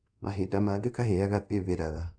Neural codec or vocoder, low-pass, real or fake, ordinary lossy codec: codec, 24 kHz, 0.5 kbps, DualCodec; 9.9 kHz; fake; none